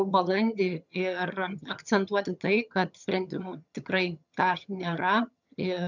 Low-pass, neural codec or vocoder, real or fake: 7.2 kHz; vocoder, 22.05 kHz, 80 mel bands, HiFi-GAN; fake